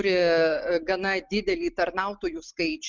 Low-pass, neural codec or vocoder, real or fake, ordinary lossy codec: 7.2 kHz; none; real; Opus, 24 kbps